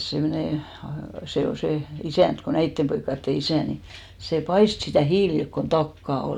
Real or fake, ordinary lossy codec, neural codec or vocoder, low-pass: real; none; none; 19.8 kHz